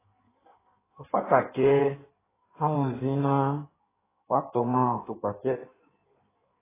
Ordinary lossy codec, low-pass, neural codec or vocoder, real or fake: AAC, 16 kbps; 3.6 kHz; codec, 16 kHz in and 24 kHz out, 1.1 kbps, FireRedTTS-2 codec; fake